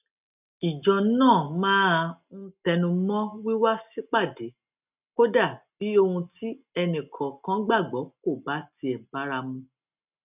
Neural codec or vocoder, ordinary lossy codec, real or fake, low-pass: none; none; real; 3.6 kHz